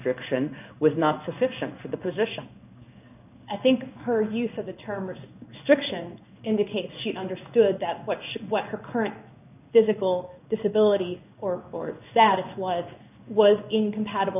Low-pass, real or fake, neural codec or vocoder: 3.6 kHz; fake; codec, 16 kHz in and 24 kHz out, 1 kbps, XY-Tokenizer